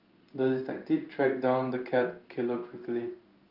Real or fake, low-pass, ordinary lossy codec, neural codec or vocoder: fake; 5.4 kHz; Opus, 24 kbps; codec, 16 kHz in and 24 kHz out, 1 kbps, XY-Tokenizer